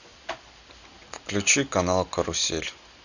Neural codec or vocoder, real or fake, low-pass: none; real; 7.2 kHz